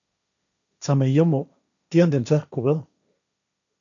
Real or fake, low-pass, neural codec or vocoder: fake; 7.2 kHz; codec, 16 kHz, 1.1 kbps, Voila-Tokenizer